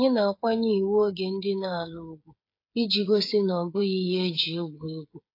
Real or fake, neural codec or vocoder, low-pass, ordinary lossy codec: fake; codec, 16 kHz, 8 kbps, FreqCodec, smaller model; 5.4 kHz; AAC, 32 kbps